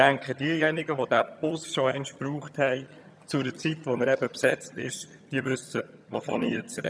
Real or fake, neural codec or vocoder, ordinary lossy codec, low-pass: fake; vocoder, 22.05 kHz, 80 mel bands, HiFi-GAN; none; none